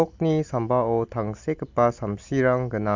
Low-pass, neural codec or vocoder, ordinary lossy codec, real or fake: 7.2 kHz; none; MP3, 64 kbps; real